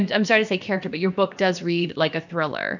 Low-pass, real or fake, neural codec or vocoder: 7.2 kHz; fake; codec, 16 kHz, about 1 kbps, DyCAST, with the encoder's durations